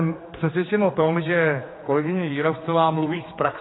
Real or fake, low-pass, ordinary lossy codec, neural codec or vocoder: fake; 7.2 kHz; AAC, 16 kbps; codec, 16 kHz, 4 kbps, X-Codec, HuBERT features, trained on general audio